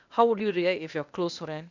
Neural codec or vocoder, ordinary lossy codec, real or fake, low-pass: codec, 16 kHz, 0.8 kbps, ZipCodec; none; fake; 7.2 kHz